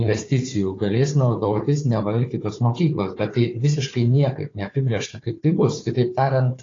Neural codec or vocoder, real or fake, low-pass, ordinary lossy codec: codec, 16 kHz, 4 kbps, FunCodec, trained on Chinese and English, 50 frames a second; fake; 7.2 kHz; AAC, 32 kbps